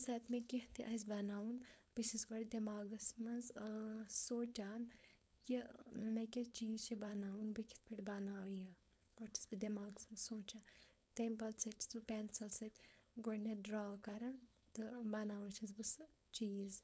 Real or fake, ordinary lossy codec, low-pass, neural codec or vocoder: fake; none; none; codec, 16 kHz, 4.8 kbps, FACodec